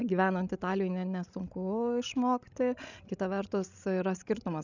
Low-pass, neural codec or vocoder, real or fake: 7.2 kHz; codec, 16 kHz, 16 kbps, FreqCodec, larger model; fake